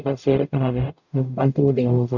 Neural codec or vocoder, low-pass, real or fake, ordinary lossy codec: codec, 44.1 kHz, 0.9 kbps, DAC; 7.2 kHz; fake; none